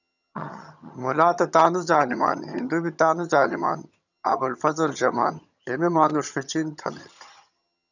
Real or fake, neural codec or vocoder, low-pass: fake; vocoder, 22.05 kHz, 80 mel bands, HiFi-GAN; 7.2 kHz